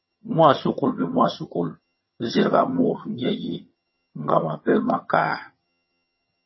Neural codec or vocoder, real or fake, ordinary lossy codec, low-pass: vocoder, 22.05 kHz, 80 mel bands, HiFi-GAN; fake; MP3, 24 kbps; 7.2 kHz